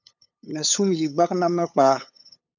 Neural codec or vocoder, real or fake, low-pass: codec, 16 kHz, 8 kbps, FunCodec, trained on LibriTTS, 25 frames a second; fake; 7.2 kHz